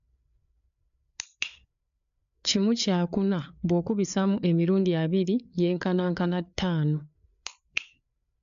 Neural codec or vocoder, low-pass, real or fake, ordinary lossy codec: codec, 16 kHz, 4 kbps, FreqCodec, larger model; 7.2 kHz; fake; MP3, 64 kbps